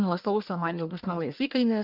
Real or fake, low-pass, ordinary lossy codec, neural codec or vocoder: fake; 5.4 kHz; Opus, 32 kbps; codec, 44.1 kHz, 1.7 kbps, Pupu-Codec